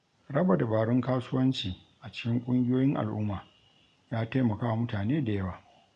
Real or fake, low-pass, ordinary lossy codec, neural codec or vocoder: real; 9.9 kHz; MP3, 96 kbps; none